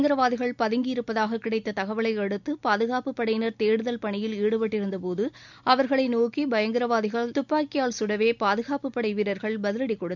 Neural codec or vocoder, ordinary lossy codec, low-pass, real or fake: none; none; 7.2 kHz; real